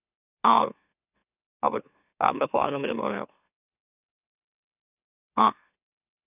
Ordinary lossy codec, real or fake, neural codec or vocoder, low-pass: none; fake; autoencoder, 44.1 kHz, a latent of 192 numbers a frame, MeloTTS; 3.6 kHz